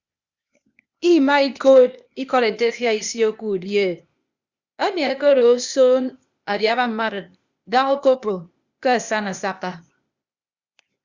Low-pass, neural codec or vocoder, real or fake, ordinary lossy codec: 7.2 kHz; codec, 16 kHz, 0.8 kbps, ZipCodec; fake; Opus, 64 kbps